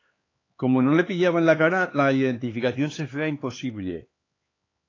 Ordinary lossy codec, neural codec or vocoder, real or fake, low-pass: AAC, 32 kbps; codec, 16 kHz, 4 kbps, X-Codec, HuBERT features, trained on LibriSpeech; fake; 7.2 kHz